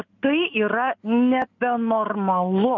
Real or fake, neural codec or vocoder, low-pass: real; none; 7.2 kHz